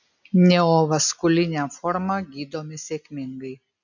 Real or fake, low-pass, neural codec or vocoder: real; 7.2 kHz; none